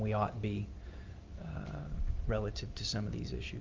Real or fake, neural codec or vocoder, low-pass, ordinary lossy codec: real; none; 7.2 kHz; Opus, 24 kbps